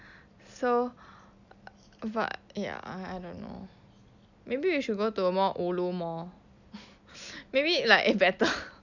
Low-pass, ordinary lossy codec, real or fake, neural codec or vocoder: 7.2 kHz; none; real; none